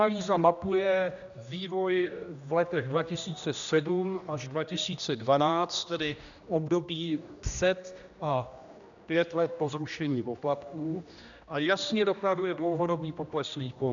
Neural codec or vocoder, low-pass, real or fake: codec, 16 kHz, 1 kbps, X-Codec, HuBERT features, trained on general audio; 7.2 kHz; fake